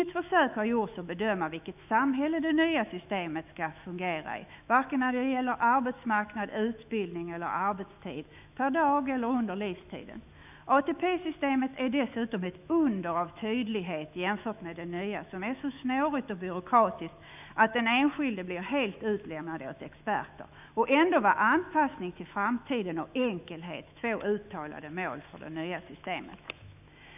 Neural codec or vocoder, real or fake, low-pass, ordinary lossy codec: autoencoder, 48 kHz, 128 numbers a frame, DAC-VAE, trained on Japanese speech; fake; 3.6 kHz; none